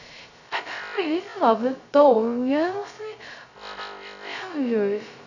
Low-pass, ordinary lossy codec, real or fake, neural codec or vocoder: 7.2 kHz; none; fake; codec, 16 kHz, 0.2 kbps, FocalCodec